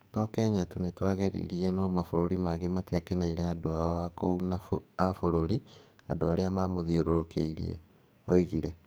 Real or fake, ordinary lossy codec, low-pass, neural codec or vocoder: fake; none; none; codec, 44.1 kHz, 2.6 kbps, SNAC